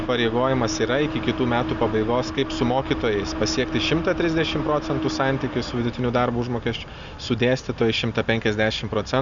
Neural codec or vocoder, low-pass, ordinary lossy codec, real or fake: none; 7.2 kHz; Opus, 64 kbps; real